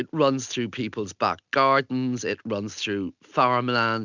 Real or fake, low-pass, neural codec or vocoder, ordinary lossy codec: real; 7.2 kHz; none; Opus, 64 kbps